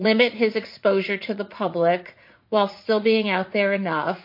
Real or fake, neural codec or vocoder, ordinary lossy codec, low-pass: fake; vocoder, 44.1 kHz, 80 mel bands, Vocos; MP3, 32 kbps; 5.4 kHz